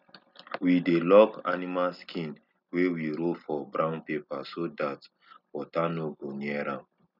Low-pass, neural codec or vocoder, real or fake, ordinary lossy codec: 5.4 kHz; none; real; none